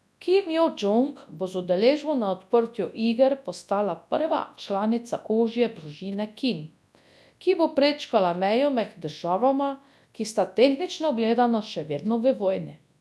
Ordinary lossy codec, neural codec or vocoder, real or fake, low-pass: none; codec, 24 kHz, 0.9 kbps, WavTokenizer, large speech release; fake; none